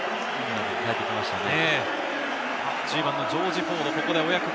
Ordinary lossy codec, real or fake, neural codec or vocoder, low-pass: none; real; none; none